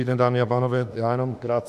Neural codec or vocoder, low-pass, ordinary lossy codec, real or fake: autoencoder, 48 kHz, 32 numbers a frame, DAC-VAE, trained on Japanese speech; 14.4 kHz; MP3, 96 kbps; fake